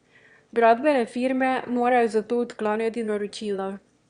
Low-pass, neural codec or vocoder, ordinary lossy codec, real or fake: 9.9 kHz; autoencoder, 22.05 kHz, a latent of 192 numbers a frame, VITS, trained on one speaker; Opus, 64 kbps; fake